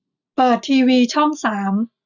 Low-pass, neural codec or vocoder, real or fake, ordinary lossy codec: 7.2 kHz; none; real; none